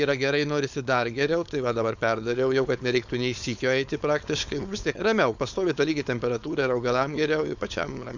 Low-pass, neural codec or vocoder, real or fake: 7.2 kHz; codec, 16 kHz, 4.8 kbps, FACodec; fake